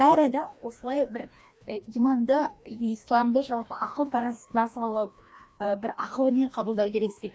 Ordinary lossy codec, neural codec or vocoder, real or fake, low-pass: none; codec, 16 kHz, 1 kbps, FreqCodec, larger model; fake; none